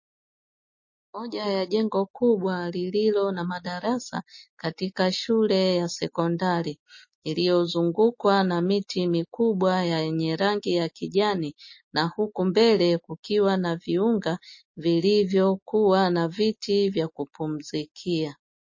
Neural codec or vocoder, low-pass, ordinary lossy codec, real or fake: none; 7.2 kHz; MP3, 32 kbps; real